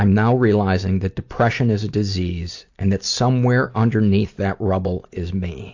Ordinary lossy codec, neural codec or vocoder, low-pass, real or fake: AAC, 48 kbps; none; 7.2 kHz; real